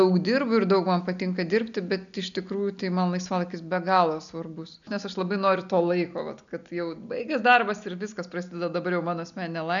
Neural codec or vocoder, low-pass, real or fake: none; 7.2 kHz; real